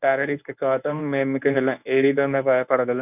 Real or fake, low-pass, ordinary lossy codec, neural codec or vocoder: fake; 3.6 kHz; none; codec, 24 kHz, 0.9 kbps, WavTokenizer, medium speech release version 1